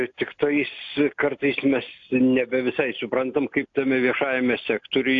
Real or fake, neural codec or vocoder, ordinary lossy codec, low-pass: real; none; AAC, 48 kbps; 7.2 kHz